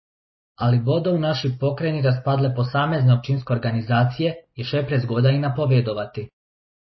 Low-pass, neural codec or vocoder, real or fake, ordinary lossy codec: 7.2 kHz; none; real; MP3, 24 kbps